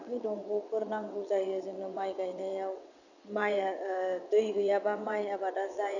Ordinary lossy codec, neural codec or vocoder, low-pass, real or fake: none; vocoder, 22.05 kHz, 80 mel bands, WaveNeXt; 7.2 kHz; fake